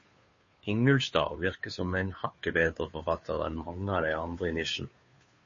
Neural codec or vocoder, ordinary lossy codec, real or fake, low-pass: codec, 16 kHz, 2 kbps, FunCodec, trained on Chinese and English, 25 frames a second; MP3, 32 kbps; fake; 7.2 kHz